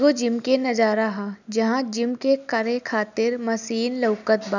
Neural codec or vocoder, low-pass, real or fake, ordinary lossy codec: none; 7.2 kHz; real; none